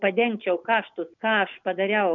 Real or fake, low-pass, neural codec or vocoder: real; 7.2 kHz; none